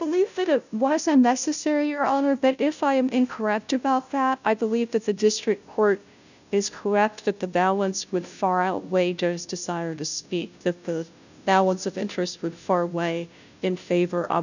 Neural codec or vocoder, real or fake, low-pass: codec, 16 kHz, 0.5 kbps, FunCodec, trained on Chinese and English, 25 frames a second; fake; 7.2 kHz